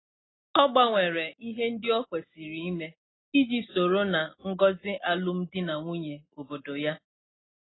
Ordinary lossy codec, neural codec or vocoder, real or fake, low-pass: AAC, 16 kbps; none; real; 7.2 kHz